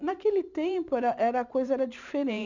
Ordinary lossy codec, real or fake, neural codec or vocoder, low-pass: none; fake; vocoder, 44.1 kHz, 128 mel bands every 512 samples, BigVGAN v2; 7.2 kHz